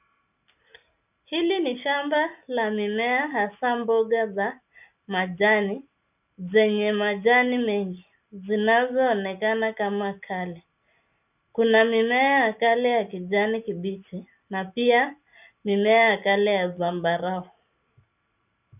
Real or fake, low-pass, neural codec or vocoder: real; 3.6 kHz; none